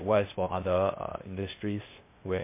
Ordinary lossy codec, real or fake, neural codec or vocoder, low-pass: MP3, 24 kbps; fake; codec, 16 kHz, 0.8 kbps, ZipCodec; 3.6 kHz